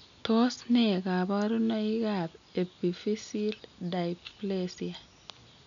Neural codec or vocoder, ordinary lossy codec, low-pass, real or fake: none; none; 7.2 kHz; real